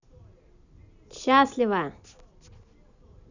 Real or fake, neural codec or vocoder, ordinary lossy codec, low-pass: real; none; none; 7.2 kHz